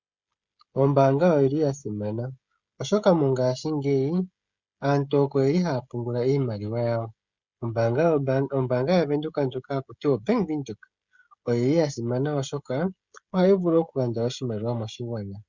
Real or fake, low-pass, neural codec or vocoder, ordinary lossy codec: fake; 7.2 kHz; codec, 16 kHz, 16 kbps, FreqCodec, smaller model; Opus, 64 kbps